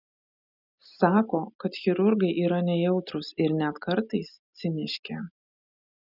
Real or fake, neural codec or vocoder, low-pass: real; none; 5.4 kHz